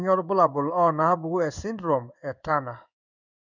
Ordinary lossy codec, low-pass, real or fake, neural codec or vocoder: none; 7.2 kHz; fake; codec, 16 kHz in and 24 kHz out, 1 kbps, XY-Tokenizer